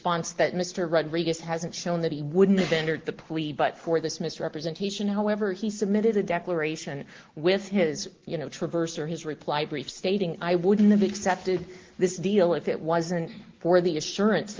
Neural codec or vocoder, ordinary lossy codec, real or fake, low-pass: none; Opus, 16 kbps; real; 7.2 kHz